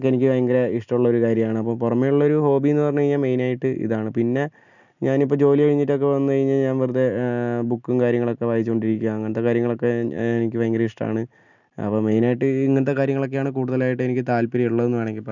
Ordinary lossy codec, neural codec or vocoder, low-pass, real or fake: none; none; 7.2 kHz; real